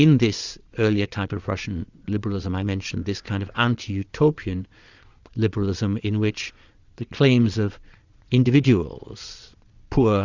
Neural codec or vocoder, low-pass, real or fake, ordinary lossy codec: vocoder, 22.05 kHz, 80 mel bands, Vocos; 7.2 kHz; fake; Opus, 64 kbps